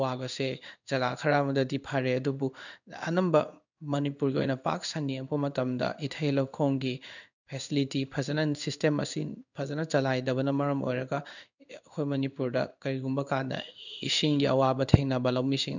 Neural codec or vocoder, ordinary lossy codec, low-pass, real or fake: codec, 16 kHz in and 24 kHz out, 1 kbps, XY-Tokenizer; none; 7.2 kHz; fake